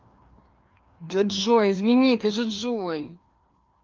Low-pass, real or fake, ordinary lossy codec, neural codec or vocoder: 7.2 kHz; fake; Opus, 24 kbps; codec, 16 kHz, 2 kbps, FreqCodec, larger model